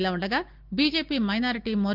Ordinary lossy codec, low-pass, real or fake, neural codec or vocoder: Opus, 32 kbps; 5.4 kHz; real; none